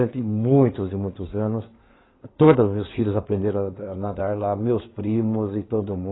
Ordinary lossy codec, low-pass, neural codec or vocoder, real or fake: AAC, 16 kbps; 7.2 kHz; codec, 16 kHz in and 24 kHz out, 2.2 kbps, FireRedTTS-2 codec; fake